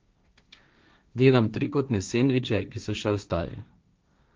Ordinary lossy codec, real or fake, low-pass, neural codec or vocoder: Opus, 24 kbps; fake; 7.2 kHz; codec, 16 kHz, 1.1 kbps, Voila-Tokenizer